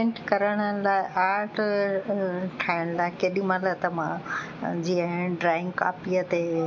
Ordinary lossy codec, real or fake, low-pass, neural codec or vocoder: MP3, 48 kbps; real; 7.2 kHz; none